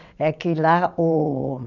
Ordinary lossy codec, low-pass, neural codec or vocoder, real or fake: none; 7.2 kHz; vocoder, 22.05 kHz, 80 mel bands, WaveNeXt; fake